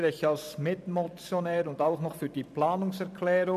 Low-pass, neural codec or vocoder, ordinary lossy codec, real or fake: 14.4 kHz; vocoder, 44.1 kHz, 128 mel bands every 512 samples, BigVGAN v2; none; fake